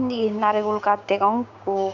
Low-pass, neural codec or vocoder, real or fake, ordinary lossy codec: 7.2 kHz; codec, 16 kHz, 6 kbps, DAC; fake; MP3, 64 kbps